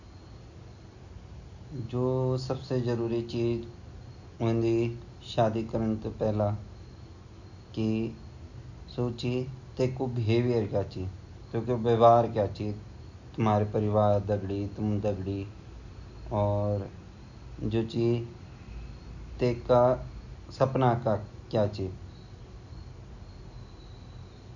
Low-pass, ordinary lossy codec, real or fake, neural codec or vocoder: 7.2 kHz; none; real; none